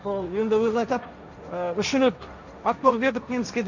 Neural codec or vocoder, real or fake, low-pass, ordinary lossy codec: codec, 16 kHz, 1.1 kbps, Voila-Tokenizer; fake; 7.2 kHz; none